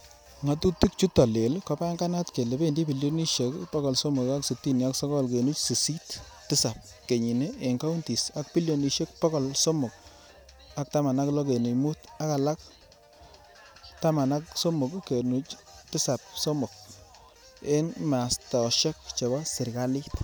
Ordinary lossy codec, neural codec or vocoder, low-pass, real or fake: none; none; none; real